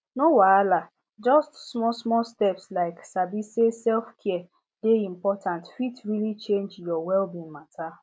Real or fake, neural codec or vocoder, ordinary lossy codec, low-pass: real; none; none; none